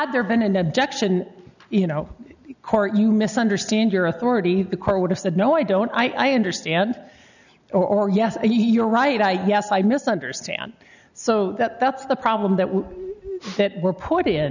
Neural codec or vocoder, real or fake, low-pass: none; real; 7.2 kHz